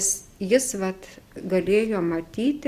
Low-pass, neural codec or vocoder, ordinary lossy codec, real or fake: 14.4 kHz; none; Opus, 64 kbps; real